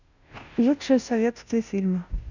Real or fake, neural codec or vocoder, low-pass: fake; codec, 16 kHz, 0.5 kbps, FunCodec, trained on Chinese and English, 25 frames a second; 7.2 kHz